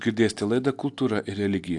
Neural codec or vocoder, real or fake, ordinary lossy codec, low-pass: none; real; AAC, 64 kbps; 10.8 kHz